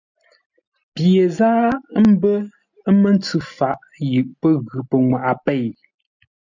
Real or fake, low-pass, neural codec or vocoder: real; 7.2 kHz; none